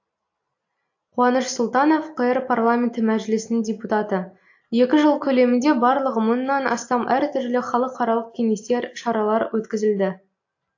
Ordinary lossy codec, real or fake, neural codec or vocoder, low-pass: AAC, 48 kbps; real; none; 7.2 kHz